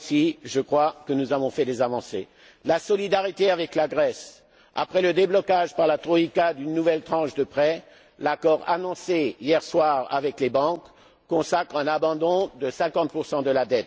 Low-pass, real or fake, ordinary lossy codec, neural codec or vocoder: none; real; none; none